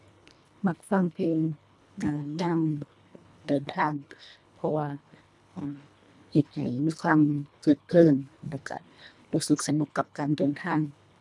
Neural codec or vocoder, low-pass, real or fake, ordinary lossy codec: codec, 24 kHz, 1.5 kbps, HILCodec; none; fake; none